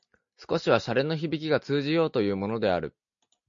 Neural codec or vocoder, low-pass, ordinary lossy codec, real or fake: none; 7.2 kHz; MP3, 48 kbps; real